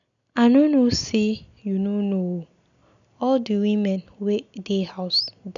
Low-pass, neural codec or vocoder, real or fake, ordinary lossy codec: 7.2 kHz; none; real; none